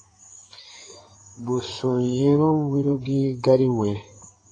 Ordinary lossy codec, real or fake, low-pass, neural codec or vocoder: AAC, 32 kbps; fake; 9.9 kHz; codec, 16 kHz in and 24 kHz out, 2.2 kbps, FireRedTTS-2 codec